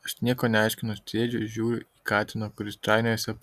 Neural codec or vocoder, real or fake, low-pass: none; real; 14.4 kHz